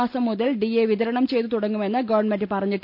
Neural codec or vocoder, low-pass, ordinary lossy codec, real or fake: none; 5.4 kHz; none; real